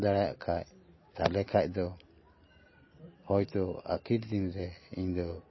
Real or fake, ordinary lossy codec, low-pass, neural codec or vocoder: real; MP3, 24 kbps; 7.2 kHz; none